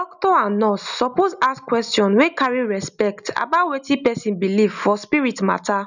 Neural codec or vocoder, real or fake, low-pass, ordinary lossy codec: none; real; 7.2 kHz; none